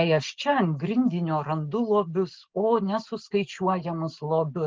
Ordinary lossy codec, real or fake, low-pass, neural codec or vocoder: Opus, 16 kbps; real; 7.2 kHz; none